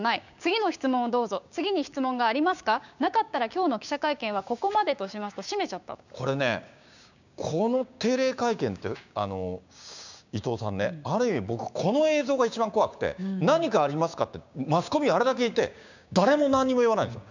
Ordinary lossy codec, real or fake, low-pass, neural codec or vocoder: none; fake; 7.2 kHz; codec, 16 kHz, 6 kbps, DAC